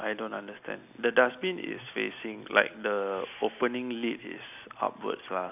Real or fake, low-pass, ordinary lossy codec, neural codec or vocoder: real; 3.6 kHz; none; none